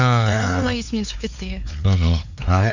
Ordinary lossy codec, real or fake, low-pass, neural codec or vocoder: none; fake; 7.2 kHz; codec, 16 kHz, 4 kbps, X-Codec, WavLM features, trained on Multilingual LibriSpeech